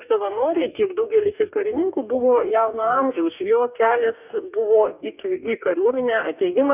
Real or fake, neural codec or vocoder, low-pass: fake; codec, 44.1 kHz, 2.6 kbps, DAC; 3.6 kHz